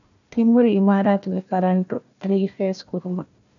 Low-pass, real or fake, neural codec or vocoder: 7.2 kHz; fake; codec, 16 kHz, 1 kbps, FunCodec, trained on Chinese and English, 50 frames a second